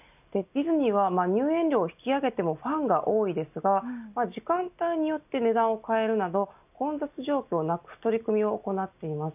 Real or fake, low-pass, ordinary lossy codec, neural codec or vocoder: real; 3.6 kHz; none; none